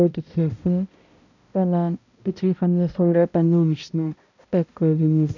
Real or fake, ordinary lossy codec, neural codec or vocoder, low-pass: fake; none; codec, 16 kHz, 0.5 kbps, X-Codec, HuBERT features, trained on balanced general audio; 7.2 kHz